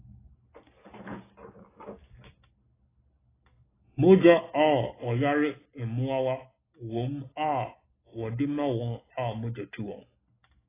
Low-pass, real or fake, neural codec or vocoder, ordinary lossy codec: 3.6 kHz; real; none; AAC, 16 kbps